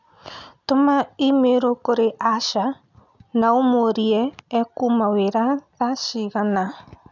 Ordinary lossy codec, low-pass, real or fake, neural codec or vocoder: none; 7.2 kHz; real; none